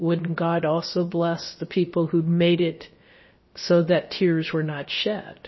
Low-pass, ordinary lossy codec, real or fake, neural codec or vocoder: 7.2 kHz; MP3, 24 kbps; fake; codec, 16 kHz, about 1 kbps, DyCAST, with the encoder's durations